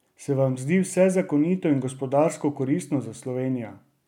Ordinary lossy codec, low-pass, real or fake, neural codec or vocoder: none; 19.8 kHz; real; none